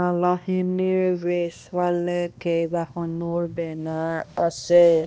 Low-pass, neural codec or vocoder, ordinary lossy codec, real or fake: none; codec, 16 kHz, 1 kbps, X-Codec, HuBERT features, trained on balanced general audio; none; fake